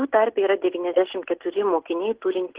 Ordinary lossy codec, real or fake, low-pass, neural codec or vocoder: Opus, 16 kbps; real; 3.6 kHz; none